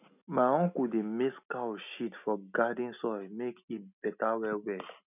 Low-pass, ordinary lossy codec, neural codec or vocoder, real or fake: 3.6 kHz; none; none; real